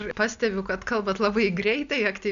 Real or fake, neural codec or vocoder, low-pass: real; none; 7.2 kHz